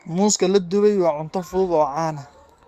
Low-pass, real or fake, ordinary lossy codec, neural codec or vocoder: 14.4 kHz; fake; Opus, 64 kbps; codec, 44.1 kHz, 7.8 kbps, DAC